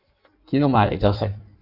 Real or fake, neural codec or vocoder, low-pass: fake; codec, 16 kHz in and 24 kHz out, 1.1 kbps, FireRedTTS-2 codec; 5.4 kHz